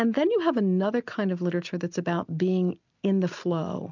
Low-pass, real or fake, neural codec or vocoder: 7.2 kHz; real; none